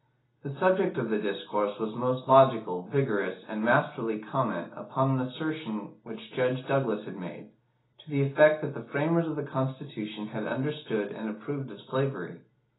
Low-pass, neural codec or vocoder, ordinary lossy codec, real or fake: 7.2 kHz; none; AAC, 16 kbps; real